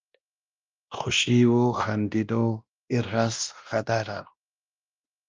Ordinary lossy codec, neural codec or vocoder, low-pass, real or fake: Opus, 32 kbps; codec, 16 kHz, 1 kbps, X-Codec, WavLM features, trained on Multilingual LibriSpeech; 7.2 kHz; fake